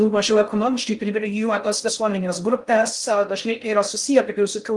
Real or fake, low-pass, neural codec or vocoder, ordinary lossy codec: fake; 10.8 kHz; codec, 16 kHz in and 24 kHz out, 0.6 kbps, FocalCodec, streaming, 2048 codes; Opus, 24 kbps